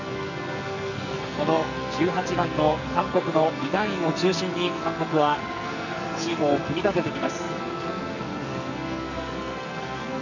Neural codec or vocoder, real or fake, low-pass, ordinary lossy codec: codec, 44.1 kHz, 2.6 kbps, SNAC; fake; 7.2 kHz; none